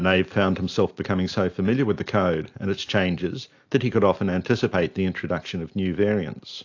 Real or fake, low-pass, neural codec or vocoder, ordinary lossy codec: real; 7.2 kHz; none; AAC, 48 kbps